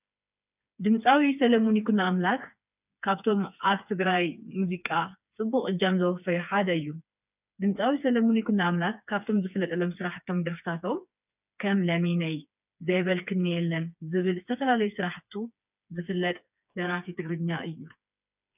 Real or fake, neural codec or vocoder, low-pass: fake; codec, 16 kHz, 4 kbps, FreqCodec, smaller model; 3.6 kHz